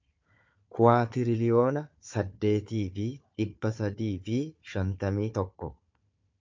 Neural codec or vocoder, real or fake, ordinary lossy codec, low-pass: codec, 16 kHz, 4 kbps, FunCodec, trained on Chinese and English, 50 frames a second; fake; MP3, 64 kbps; 7.2 kHz